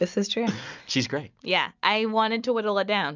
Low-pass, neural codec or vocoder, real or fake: 7.2 kHz; none; real